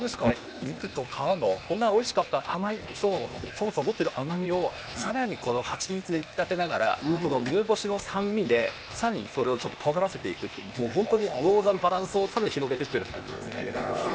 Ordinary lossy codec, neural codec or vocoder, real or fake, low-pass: none; codec, 16 kHz, 0.8 kbps, ZipCodec; fake; none